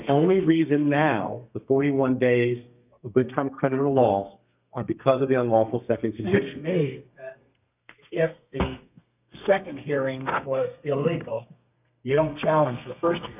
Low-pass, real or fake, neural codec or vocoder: 3.6 kHz; fake; codec, 32 kHz, 1.9 kbps, SNAC